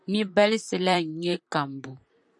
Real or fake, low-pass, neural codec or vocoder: fake; 10.8 kHz; vocoder, 44.1 kHz, 128 mel bands, Pupu-Vocoder